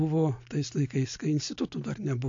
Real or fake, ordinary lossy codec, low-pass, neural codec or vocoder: real; AAC, 48 kbps; 7.2 kHz; none